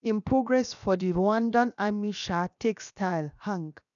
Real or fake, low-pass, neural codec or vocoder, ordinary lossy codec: fake; 7.2 kHz; codec, 16 kHz, 0.7 kbps, FocalCodec; none